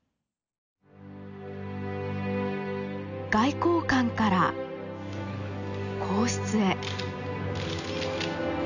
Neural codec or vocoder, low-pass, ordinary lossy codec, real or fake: none; 7.2 kHz; none; real